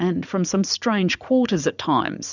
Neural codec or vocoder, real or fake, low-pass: vocoder, 44.1 kHz, 80 mel bands, Vocos; fake; 7.2 kHz